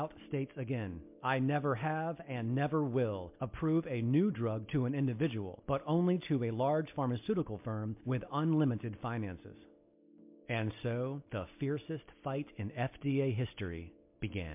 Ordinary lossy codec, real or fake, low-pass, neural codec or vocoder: MP3, 32 kbps; real; 3.6 kHz; none